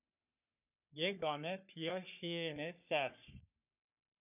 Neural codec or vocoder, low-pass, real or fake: codec, 44.1 kHz, 3.4 kbps, Pupu-Codec; 3.6 kHz; fake